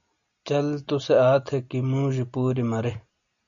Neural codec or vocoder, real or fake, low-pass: none; real; 7.2 kHz